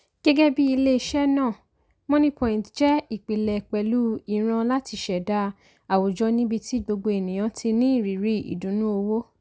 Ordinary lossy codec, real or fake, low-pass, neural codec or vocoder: none; real; none; none